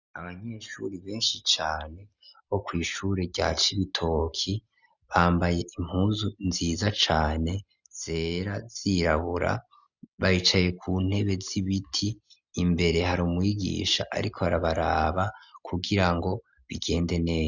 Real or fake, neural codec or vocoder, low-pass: real; none; 7.2 kHz